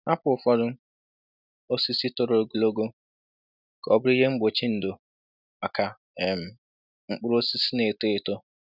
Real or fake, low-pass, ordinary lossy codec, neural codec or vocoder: real; 5.4 kHz; none; none